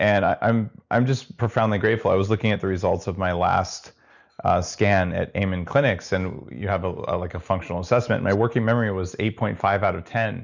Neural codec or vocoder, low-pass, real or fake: none; 7.2 kHz; real